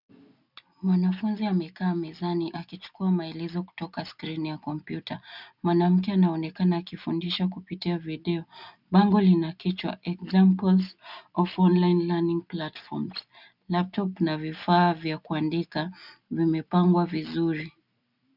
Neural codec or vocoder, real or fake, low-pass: none; real; 5.4 kHz